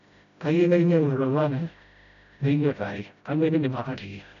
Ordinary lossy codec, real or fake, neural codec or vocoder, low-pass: none; fake; codec, 16 kHz, 0.5 kbps, FreqCodec, smaller model; 7.2 kHz